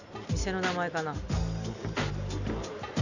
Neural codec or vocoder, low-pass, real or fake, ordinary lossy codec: none; 7.2 kHz; real; none